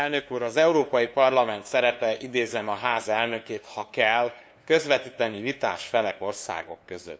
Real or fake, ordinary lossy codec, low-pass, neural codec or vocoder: fake; none; none; codec, 16 kHz, 2 kbps, FunCodec, trained on LibriTTS, 25 frames a second